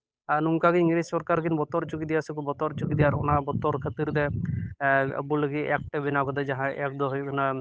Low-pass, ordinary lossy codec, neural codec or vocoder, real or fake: none; none; codec, 16 kHz, 8 kbps, FunCodec, trained on Chinese and English, 25 frames a second; fake